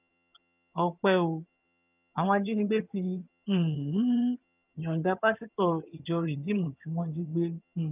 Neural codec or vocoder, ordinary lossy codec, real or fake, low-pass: vocoder, 22.05 kHz, 80 mel bands, HiFi-GAN; none; fake; 3.6 kHz